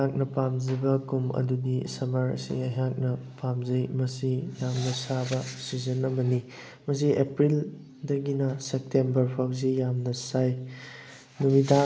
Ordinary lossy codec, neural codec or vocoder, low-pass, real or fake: none; none; none; real